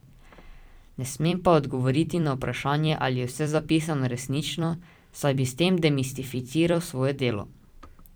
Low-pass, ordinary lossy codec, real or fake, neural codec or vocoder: none; none; fake; vocoder, 44.1 kHz, 128 mel bands every 256 samples, BigVGAN v2